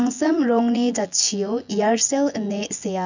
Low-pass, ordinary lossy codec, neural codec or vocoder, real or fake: 7.2 kHz; none; vocoder, 24 kHz, 100 mel bands, Vocos; fake